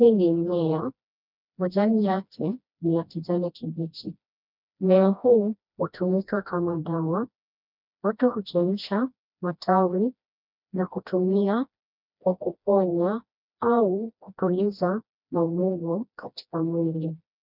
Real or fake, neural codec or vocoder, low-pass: fake; codec, 16 kHz, 1 kbps, FreqCodec, smaller model; 5.4 kHz